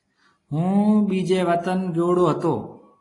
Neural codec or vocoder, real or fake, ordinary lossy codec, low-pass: none; real; AAC, 32 kbps; 10.8 kHz